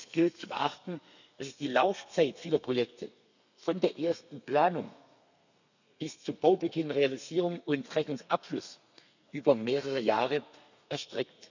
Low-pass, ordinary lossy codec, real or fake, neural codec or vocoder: 7.2 kHz; none; fake; codec, 32 kHz, 1.9 kbps, SNAC